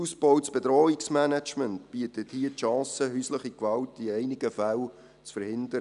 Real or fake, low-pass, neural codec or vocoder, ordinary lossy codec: real; 10.8 kHz; none; none